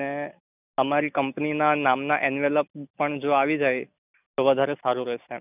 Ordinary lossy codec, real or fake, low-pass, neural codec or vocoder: none; real; 3.6 kHz; none